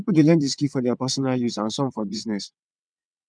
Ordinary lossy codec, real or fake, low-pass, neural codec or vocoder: none; fake; 9.9 kHz; vocoder, 22.05 kHz, 80 mel bands, WaveNeXt